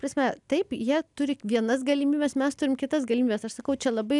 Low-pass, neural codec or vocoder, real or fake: 10.8 kHz; none; real